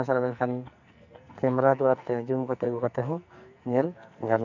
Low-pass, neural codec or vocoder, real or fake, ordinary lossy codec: 7.2 kHz; codec, 44.1 kHz, 2.6 kbps, SNAC; fake; none